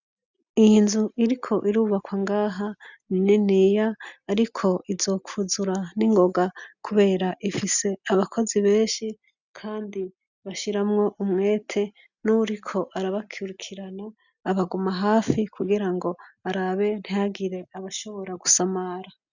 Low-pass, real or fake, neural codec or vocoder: 7.2 kHz; real; none